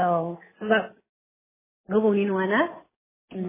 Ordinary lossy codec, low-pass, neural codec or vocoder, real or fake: MP3, 16 kbps; 3.6 kHz; none; real